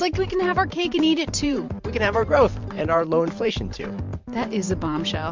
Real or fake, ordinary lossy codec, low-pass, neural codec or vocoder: fake; MP3, 64 kbps; 7.2 kHz; vocoder, 44.1 kHz, 128 mel bands every 512 samples, BigVGAN v2